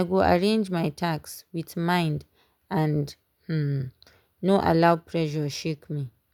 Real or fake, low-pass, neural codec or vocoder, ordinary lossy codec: real; 19.8 kHz; none; none